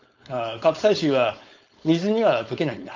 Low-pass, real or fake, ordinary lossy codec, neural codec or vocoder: 7.2 kHz; fake; Opus, 32 kbps; codec, 16 kHz, 4.8 kbps, FACodec